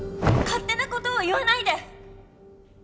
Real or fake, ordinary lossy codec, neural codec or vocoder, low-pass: real; none; none; none